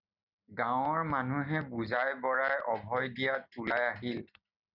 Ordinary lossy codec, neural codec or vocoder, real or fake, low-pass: Opus, 64 kbps; none; real; 5.4 kHz